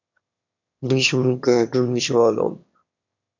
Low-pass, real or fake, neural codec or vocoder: 7.2 kHz; fake; autoencoder, 22.05 kHz, a latent of 192 numbers a frame, VITS, trained on one speaker